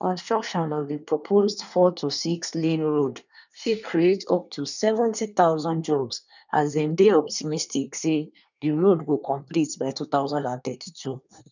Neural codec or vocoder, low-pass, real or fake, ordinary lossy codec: codec, 24 kHz, 1 kbps, SNAC; 7.2 kHz; fake; none